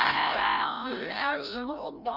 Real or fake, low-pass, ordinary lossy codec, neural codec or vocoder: fake; 5.4 kHz; none; codec, 16 kHz, 0.5 kbps, FreqCodec, larger model